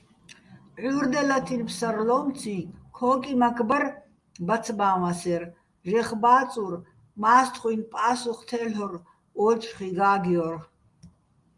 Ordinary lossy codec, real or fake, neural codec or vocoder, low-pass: Opus, 32 kbps; real; none; 10.8 kHz